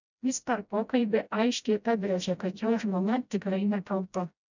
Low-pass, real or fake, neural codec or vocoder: 7.2 kHz; fake; codec, 16 kHz, 0.5 kbps, FreqCodec, smaller model